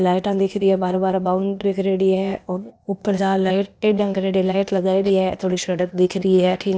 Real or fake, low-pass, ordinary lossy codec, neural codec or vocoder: fake; none; none; codec, 16 kHz, 0.8 kbps, ZipCodec